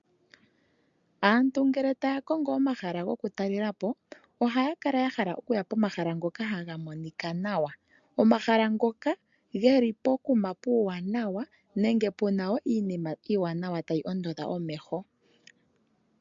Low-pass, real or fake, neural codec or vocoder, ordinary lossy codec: 7.2 kHz; real; none; MP3, 64 kbps